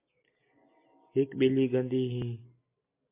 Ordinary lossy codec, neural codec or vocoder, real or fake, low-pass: MP3, 24 kbps; none; real; 3.6 kHz